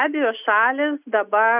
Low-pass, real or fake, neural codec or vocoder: 3.6 kHz; real; none